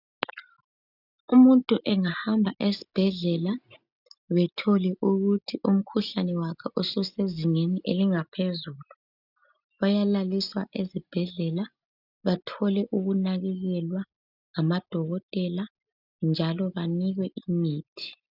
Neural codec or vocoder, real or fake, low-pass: none; real; 5.4 kHz